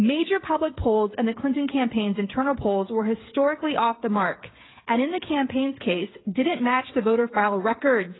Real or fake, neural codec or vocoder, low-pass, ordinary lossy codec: fake; codec, 16 kHz, 8 kbps, FreqCodec, smaller model; 7.2 kHz; AAC, 16 kbps